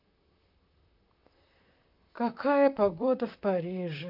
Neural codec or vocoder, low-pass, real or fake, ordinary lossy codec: vocoder, 44.1 kHz, 128 mel bands, Pupu-Vocoder; 5.4 kHz; fake; none